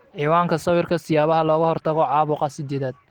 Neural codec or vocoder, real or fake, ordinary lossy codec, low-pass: none; real; Opus, 16 kbps; 19.8 kHz